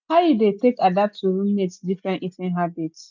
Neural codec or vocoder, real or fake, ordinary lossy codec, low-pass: none; real; none; 7.2 kHz